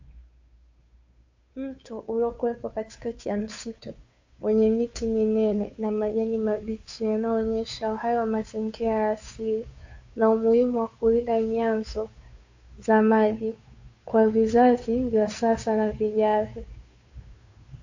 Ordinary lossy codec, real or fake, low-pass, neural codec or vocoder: AAC, 48 kbps; fake; 7.2 kHz; codec, 16 kHz, 2 kbps, FunCodec, trained on Chinese and English, 25 frames a second